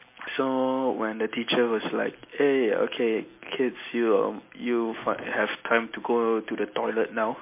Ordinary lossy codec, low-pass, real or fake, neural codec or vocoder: MP3, 24 kbps; 3.6 kHz; real; none